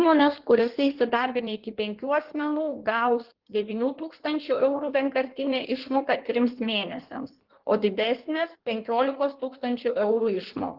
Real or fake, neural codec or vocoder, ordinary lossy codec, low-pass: fake; codec, 16 kHz in and 24 kHz out, 1.1 kbps, FireRedTTS-2 codec; Opus, 16 kbps; 5.4 kHz